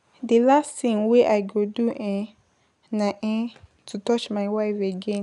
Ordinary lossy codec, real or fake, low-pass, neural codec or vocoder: none; real; 10.8 kHz; none